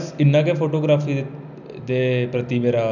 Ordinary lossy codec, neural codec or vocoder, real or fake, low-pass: none; none; real; 7.2 kHz